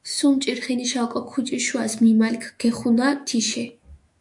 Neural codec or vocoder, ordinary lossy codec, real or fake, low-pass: autoencoder, 48 kHz, 128 numbers a frame, DAC-VAE, trained on Japanese speech; MP3, 64 kbps; fake; 10.8 kHz